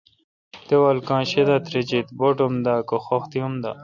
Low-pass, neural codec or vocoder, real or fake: 7.2 kHz; none; real